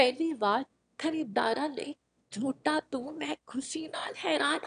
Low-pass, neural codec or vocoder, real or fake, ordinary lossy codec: 9.9 kHz; autoencoder, 22.05 kHz, a latent of 192 numbers a frame, VITS, trained on one speaker; fake; none